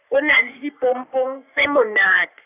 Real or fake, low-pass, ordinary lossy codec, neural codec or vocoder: fake; 3.6 kHz; none; codec, 44.1 kHz, 3.4 kbps, Pupu-Codec